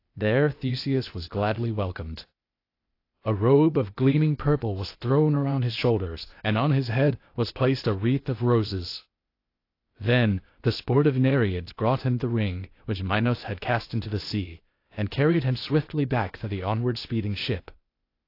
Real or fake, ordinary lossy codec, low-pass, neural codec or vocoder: fake; AAC, 32 kbps; 5.4 kHz; codec, 16 kHz, 0.8 kbps, ZipCodec